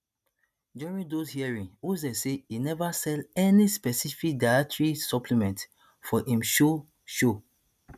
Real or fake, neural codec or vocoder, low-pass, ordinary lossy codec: real; none; 14.4 kHz; none